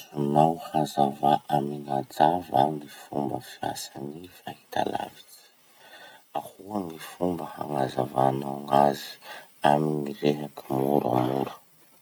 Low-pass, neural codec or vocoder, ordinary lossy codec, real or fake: none; none; none; real